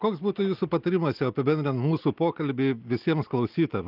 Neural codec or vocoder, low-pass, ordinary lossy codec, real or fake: none; 5.4 kHz; Opus, 32 kbps; real